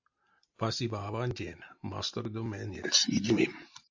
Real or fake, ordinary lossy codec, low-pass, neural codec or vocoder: real; AAC, 48 kbps; 7.2 kHz; none